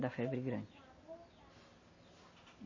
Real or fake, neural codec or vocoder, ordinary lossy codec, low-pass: real; none; MP3, 32 kbps; 7.2 kHz